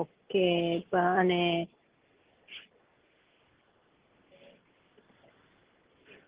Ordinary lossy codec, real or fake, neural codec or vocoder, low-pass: Opus, 16 kbps; real; none; 3.6 kHz